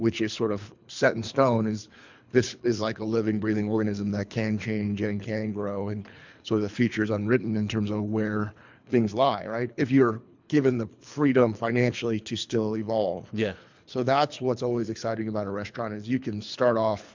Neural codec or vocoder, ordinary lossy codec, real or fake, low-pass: codec, 24 kHz, 3 kbps, HILCodec; MP3, 64 kbps; fake; 7.2 kHz